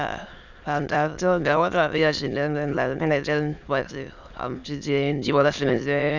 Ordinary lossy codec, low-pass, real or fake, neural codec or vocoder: none; 7.2 kHz; fake; autoencoder, 22.05 kHz, a latent of 192 numbers a frame, VITS, trained on many speakers